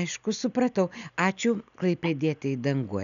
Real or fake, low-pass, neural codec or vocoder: real; 7.2 kHz; none